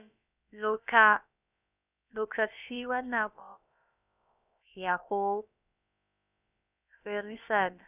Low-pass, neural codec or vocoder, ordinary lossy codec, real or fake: 3.6 kHz; codec, 16 kHz, about 1 kbps, DyCAST, with the encoder's durations; none; fake